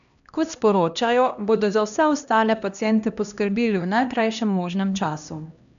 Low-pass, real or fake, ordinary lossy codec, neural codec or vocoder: 7.2 kHz; fake; none; codec, 16 kHz, 1 kbps, X-Codec, HuBERT features, trained on LibriSpeech